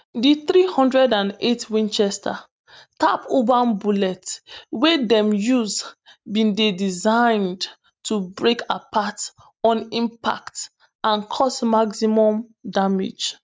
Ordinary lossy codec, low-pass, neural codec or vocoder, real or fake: none; none; none; real